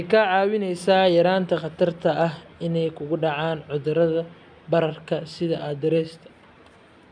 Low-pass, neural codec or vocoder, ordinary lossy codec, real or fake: 9.9 kHz; none; none; real